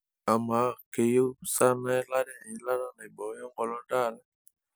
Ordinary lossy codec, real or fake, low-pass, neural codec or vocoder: none; real; none; none